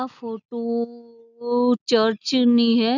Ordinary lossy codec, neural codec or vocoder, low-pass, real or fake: none; none; 7.2 kHz; real